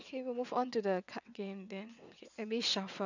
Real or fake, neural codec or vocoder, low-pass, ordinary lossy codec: fake; vocoder, 22.05 kHz, 80 mel bands, WaveNeXt; 7.2 kHz; none